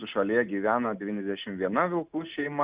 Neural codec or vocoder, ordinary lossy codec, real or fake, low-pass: none; Opus, 64 kbps; real; 3.6 kHz